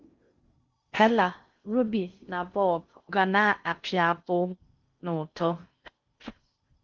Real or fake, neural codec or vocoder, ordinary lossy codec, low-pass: fake; codec, 16 kHz in and 24 kHz out, 0.6 kbps, FocalCodec, streaming, 4096 codes; Opus, 32 kbps; 7.2 kHz